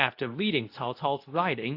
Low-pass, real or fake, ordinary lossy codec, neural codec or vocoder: 5.4 kHz; fake; AAC, 32 kbps; codec, 24 kHz, 0.9 kbps, WavTokenizer, medium speech release version 2